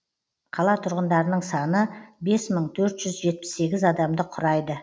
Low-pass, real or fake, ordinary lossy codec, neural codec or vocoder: none; real; none; none